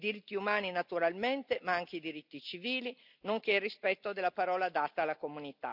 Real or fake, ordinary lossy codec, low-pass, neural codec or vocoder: real; none; 5.4 kHz; none